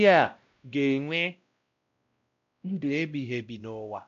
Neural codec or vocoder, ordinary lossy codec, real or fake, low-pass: codec, 16 kHz, 0.5 kbps, X-Codec, WavLM features, trained on Multilingual LibriSpeech; MP3, 64 kbps; fake; 7.2 kHz